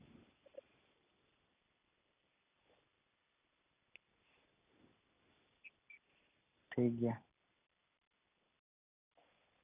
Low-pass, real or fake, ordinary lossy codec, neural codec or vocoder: 3.6 kHz; real; none; none